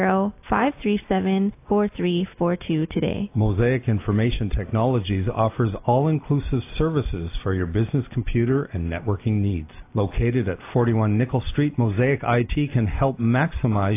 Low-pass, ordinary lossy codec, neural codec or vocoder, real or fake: 3.6 kHz; AAC, 24 kbps; none; real